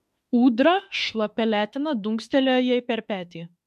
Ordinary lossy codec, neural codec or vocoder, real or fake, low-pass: MP3, 64 kbps; autoencoder, 48 kHz, 32 numbers a frame, DAC-VAE, trained on Japanese speech; fake; 14.4 kHz